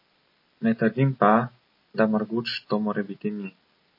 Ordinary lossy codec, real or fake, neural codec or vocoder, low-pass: MP3, 24 kbps; real; none; 5.4 kHz